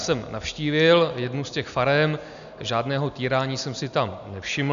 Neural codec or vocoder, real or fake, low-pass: none; real; 7.2 kHz